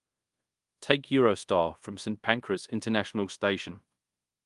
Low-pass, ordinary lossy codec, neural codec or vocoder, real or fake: 10.8 kHz; Opus, 32 kbps; codec, 24 kHz, 0.5 kbps, DualCodec; fake